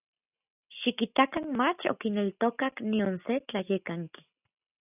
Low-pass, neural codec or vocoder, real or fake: 3.6 kHz; none; real